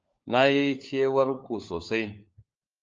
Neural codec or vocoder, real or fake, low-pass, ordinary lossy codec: codec, 16 kHz, 4 kbps, FunCodec, trained on LibriTTS, 50 frames a second; fake; 7.2 kHz; Opus, 24 kbps